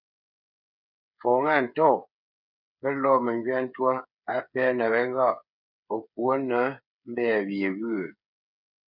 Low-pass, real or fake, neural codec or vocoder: 5.4 kHz; fake; codec, 16 kHz, 8 kbps, FreqCodec, smaller model